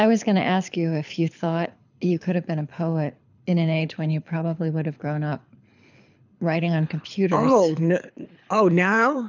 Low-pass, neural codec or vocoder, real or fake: 7.2 kHz; codec, 24 kHz, 6 kbps, HILCodec; fake